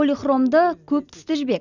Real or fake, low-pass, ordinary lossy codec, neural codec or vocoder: real; 7.2 kHz; none; none